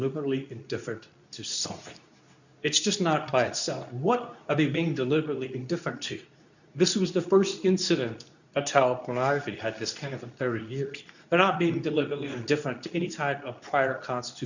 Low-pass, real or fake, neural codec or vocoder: 7.2 kHz; fake; codec, 24 kHz, 0.9 kbps, WavTokenizer, medium speech release version 2